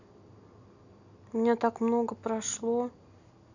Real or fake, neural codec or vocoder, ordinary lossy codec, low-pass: real; none; none; 7.2 kHz